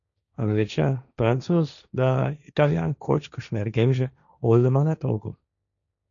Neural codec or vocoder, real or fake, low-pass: codec, 16 kHz, 1.1 kbps, Voila-Tokenizer; fake; 7.2 kHz